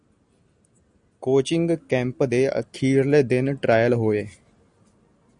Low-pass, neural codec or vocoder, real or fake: 9.9 kHz; none; real